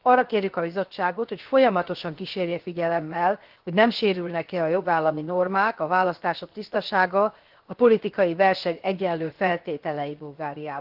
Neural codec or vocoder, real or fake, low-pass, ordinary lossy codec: codec, 16 kHz, 0.7 kbps, FocalCodec; fake; 5.4 kHz; Opus, 16 kbps